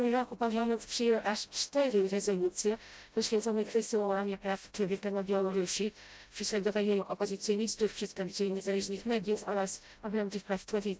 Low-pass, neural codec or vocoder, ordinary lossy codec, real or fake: none; codec, 16 kHz, 0.5 kbps, FreqCodec, smaller model; none; fake